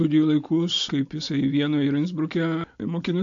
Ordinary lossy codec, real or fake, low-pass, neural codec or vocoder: AAC, 64 kbps; real; 7.2 kHz; none